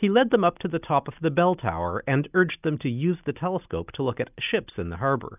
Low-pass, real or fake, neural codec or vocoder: 3.6 kHz; real; none